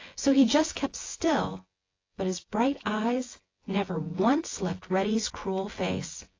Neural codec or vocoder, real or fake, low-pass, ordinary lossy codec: vocoder, 24 kHz, 100 mel bands, Vocos; fake; 7.2 kHz; AAC, 32 kbps